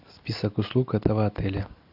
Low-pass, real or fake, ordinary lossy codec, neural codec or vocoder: 5.4 kHz; real; AAC, 48 kbps; none